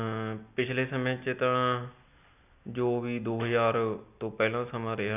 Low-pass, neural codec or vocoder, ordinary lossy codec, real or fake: 3.6 kHz; none; none; real